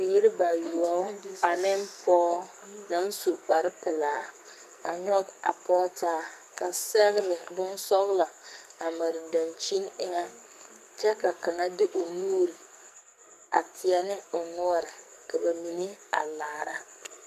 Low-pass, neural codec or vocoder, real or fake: 14.4 kHz; codec, 44.1 kHz, 2.6 kbps, SNAC; fake